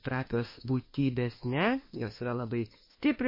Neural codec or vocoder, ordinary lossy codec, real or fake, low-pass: autoencoder, 48 kHz, 32 numbers a frame, DAC-VAE, trained on Japanese speech; MP3, 24 kbps; fake; 5.4 kHz